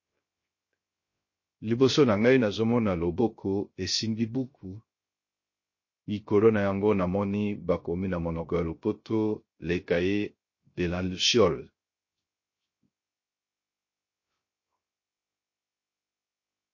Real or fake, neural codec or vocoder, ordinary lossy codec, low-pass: fake; codec, 16 kHz, 0.3 kbps, FocalCodec; MP3, 32 kbps; 7.2 kHz